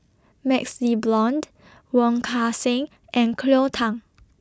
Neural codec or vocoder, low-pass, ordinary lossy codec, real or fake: none; none; none; real